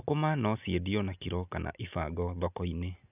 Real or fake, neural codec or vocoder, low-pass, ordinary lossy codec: real; none; 3.6 kHz; none